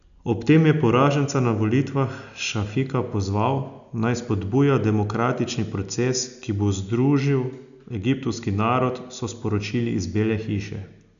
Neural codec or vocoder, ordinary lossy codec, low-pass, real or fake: none; none; 7.2 kHz; real